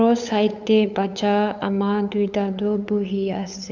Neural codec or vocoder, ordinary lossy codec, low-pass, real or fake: codec, 16 kHz, 8 kbps, FunCodec, trained on LibriTTS, 25 frames a second; none; 7.2 kHz; fake